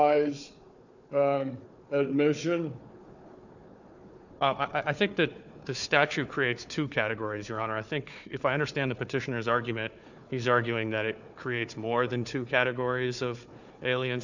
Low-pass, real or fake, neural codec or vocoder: 7.2 kHz; fake; codec, 16 kHz, 4 kbps, FunCodec, trained on Chinese and English, 50 frames a second